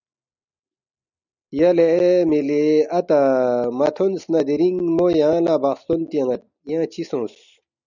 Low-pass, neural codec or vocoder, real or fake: 7.2 kHz; none; real